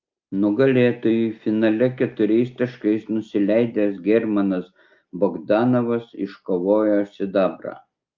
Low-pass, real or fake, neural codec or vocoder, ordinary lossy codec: 7.2 kHz; real; none; Opus, 24 kbps